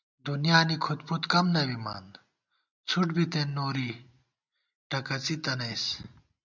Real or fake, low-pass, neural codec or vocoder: real; 7.2 kHz; none